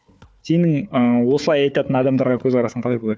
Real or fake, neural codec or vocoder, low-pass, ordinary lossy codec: fake; codec, 16 kHz, 4 kbps, FunCodec, trained on Chinese and English, 50 frames a second; none; none